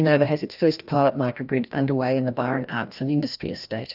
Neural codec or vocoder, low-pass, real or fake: codec, 16 kHz, 1 kbps, FreqCodec, larger model; 5.4 kHz; fake